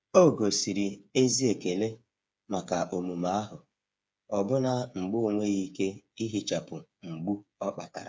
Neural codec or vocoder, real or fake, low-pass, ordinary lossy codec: codec, 16 kHz, 8 kbps, FreqCodec, smaller model; fake; none; none